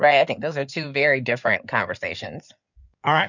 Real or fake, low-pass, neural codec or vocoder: fake; 7.2 kHz; codec, 16 kHz in and 24 kHz out, 2.2 kbps, FireRedTTS-2 codec